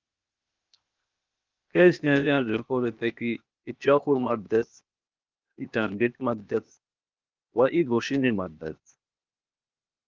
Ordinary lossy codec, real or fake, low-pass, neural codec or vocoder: Opus, 24 kbps; fake; 7.2 kHz; codec, 16 kHz, 0.8 kbps, ZipCodec